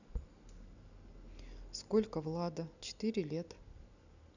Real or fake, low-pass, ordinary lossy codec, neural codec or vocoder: real; 7.2 kHz; none; none